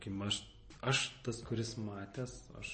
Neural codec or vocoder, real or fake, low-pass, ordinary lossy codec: none; real; 10.8 kHz; MP3, 32 kbps